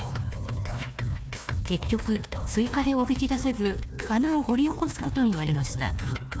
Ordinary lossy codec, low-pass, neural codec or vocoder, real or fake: none; none; codec, 16 kHz, 1 kbps, FunCodec, trained on Chinese and English, 50 frames a second; fake